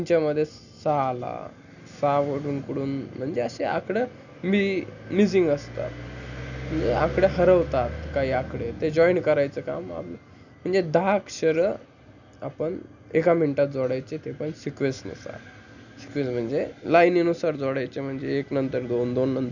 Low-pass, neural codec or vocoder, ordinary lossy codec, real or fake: 7.2 kHz; none; none; real